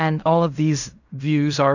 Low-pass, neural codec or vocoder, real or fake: 7.2 kHz; codec, 16 kHz in and 24 kHz out, 0.4 kbps, LongCat-Audio-Codec, fine tuned four codebook decoder; fake